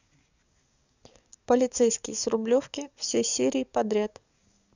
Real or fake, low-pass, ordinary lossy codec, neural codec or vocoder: fake; 7.2 kHz; none; codec, 44.1 kHz, 7.8 kbps, DAC